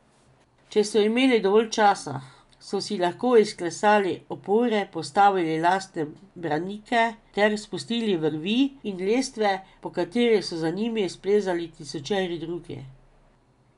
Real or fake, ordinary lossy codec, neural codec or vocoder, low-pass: real; none; none; 10.8 kHz